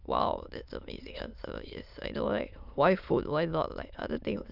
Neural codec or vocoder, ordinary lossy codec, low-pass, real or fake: autoencoder, 22.05 kHz, a latent of 192 numbers a frame, VITS, trained on many speakers; Opus, 64 kbps; 5.4 kHz; fake